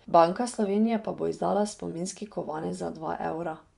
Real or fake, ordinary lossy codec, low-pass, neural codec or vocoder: fake; none; 10.8 kHz; vocoder, 24 kHz, 100 mel bands, Vocos